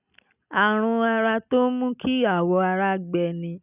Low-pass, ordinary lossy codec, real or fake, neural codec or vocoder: 3.6 kHz; none; real; none